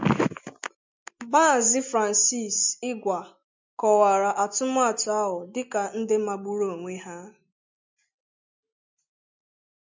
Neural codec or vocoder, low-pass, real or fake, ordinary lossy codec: none; 7.2 kHz; real; MP3, 64 kbps